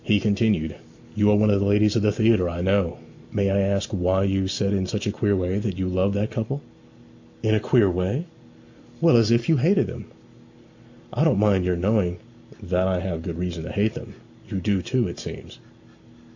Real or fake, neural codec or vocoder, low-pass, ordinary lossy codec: real; none; 7.2 kHz; AAC, 48 kbps